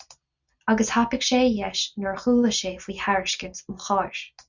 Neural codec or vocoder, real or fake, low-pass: none; real; 7.2 kHz